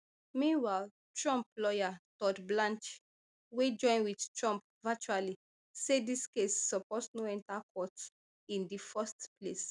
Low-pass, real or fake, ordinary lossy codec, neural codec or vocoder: 10.8 kHz; real; none; none